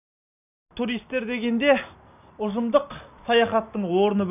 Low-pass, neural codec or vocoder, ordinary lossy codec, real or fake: 3.6 kHz; none; none; real